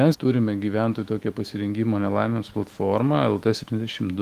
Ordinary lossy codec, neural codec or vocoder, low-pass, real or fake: Opus, 24 kbps; autoencoder, 48 kHz, 128 numbers a frame, DAC-VAE, trained on Japanese speech; 14.4 kHz; fake